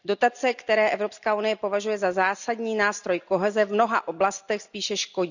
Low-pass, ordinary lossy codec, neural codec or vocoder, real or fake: 7.2 kHz; none; none; real